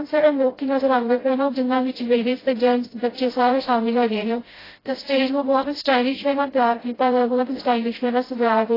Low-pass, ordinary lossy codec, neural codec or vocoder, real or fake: 5.4 kHz; AAC, 24 kbps; codec, 16 kHz, 0.5 kbps, FreqCodec, smaller model; fake